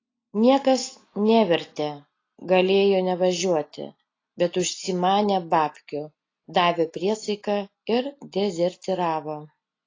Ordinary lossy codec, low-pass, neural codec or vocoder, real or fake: AAC, 32 kbps; 7.2 kHz; none; real